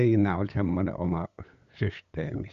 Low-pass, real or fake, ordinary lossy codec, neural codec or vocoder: 7.2 kHz; fake; Opus, 64 kbps; codec, 16 kHz, 8 kbps, FunCodec, trained on LibriTTS, 25 frames a second